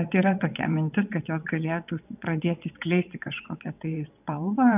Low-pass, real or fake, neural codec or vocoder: 3.6 kHz; fake; vocoder, 22.05 kHz, 80 mel bands, WaveNeXt